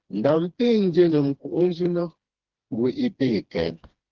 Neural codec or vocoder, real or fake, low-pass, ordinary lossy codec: codec, 16 kHz, 2 kbps, FreqCodec, smaller model; fake; 7.2 kHz; Opus, 16 kbps